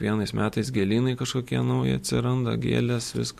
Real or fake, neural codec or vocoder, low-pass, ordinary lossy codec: real; none; 14.4 kHz; MP3, 64 kbps